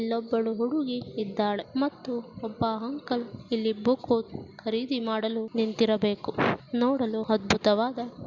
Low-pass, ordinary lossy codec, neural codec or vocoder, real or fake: 7.2 kHz; Opus, 64 kbps; none; real